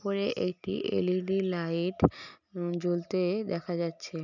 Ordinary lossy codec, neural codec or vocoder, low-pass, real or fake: none; none; 7.2 kHz; real